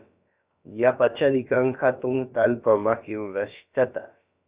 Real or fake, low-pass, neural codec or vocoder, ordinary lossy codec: fake; 3.6 kHz; codec, 16 kHz, about 1 kbps, DyCAST, with the encoder's durations; Opus, 64 kbps